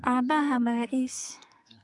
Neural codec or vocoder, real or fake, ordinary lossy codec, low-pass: codec, 44.1 kHz, 2.6 kbps, SNAC; fake; none; 10.8 kHz